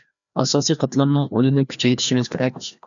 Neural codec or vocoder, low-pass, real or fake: codec, 16 kHz, 1 kbps, FreqCodec, larger model; 7.2 kHz; fake